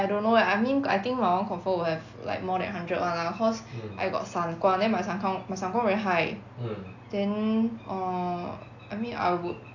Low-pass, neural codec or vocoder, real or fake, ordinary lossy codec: 7.2 kHz; none; real; AAC, 48 kbps